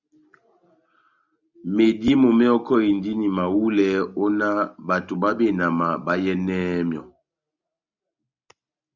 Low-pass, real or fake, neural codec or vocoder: 7.2 kHz; real; none